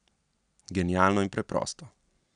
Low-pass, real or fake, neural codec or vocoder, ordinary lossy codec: 9.9 kHz; real; none; none